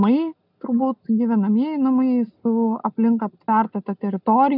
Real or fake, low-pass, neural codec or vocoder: real; 5.4 kHz; none